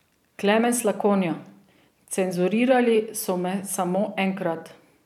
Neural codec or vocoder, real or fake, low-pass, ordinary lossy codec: vocoder, 44.1 kHz, 128 mel bands every 512 samples, BigVGAN v2; fake; 19.8 kHz; none